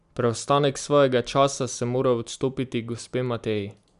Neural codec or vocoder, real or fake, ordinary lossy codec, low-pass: none; real; none; 10.8 kHz